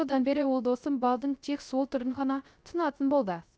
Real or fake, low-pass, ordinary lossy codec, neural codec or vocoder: fake; none; none; codec, 16 kHz, 0.3 kbps, FocalCodec